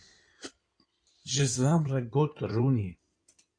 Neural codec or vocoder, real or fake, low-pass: codec, 16 kHz in and 24 kHz out, 2.2 kbps, FireRedTTS-2 codec; fake; 9.9 kHz